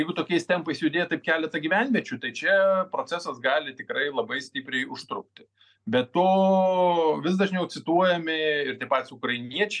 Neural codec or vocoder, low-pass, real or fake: none; 9.9 kHz; real